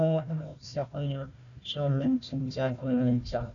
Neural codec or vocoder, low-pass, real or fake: codec, 16 kHz, 1 kbps, FunCodec, trained on Chinese and English, 50 frames a second; 7.2 kHz; fake